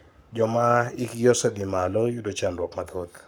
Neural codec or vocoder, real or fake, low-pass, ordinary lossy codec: codec, 44.1 kHz, 7.8 kbps, Pupu-Codec; fake; none; none